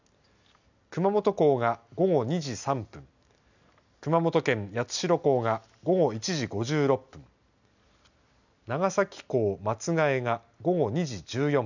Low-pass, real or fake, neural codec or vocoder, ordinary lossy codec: 7.2 kHz; real; none; none